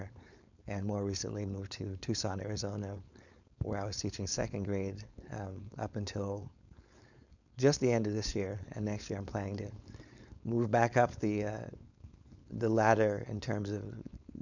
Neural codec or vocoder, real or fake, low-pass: codec, 16 kHz, 4.8 kbps, FACodec; fake; 7.2 kHz